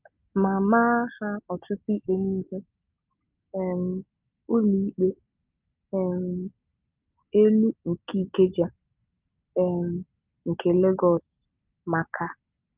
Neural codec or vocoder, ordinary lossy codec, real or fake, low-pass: none; Opus, 24 kbps; real; 3.6 kHz